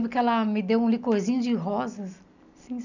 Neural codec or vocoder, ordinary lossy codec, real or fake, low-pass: none; none; real; 7.2 kHz